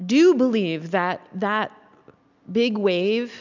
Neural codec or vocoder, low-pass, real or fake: none; 7.2 kHz; real